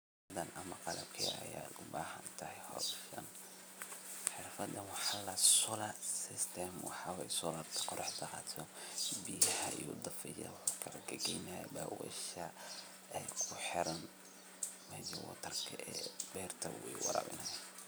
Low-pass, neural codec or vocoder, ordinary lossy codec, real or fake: none; none; none; real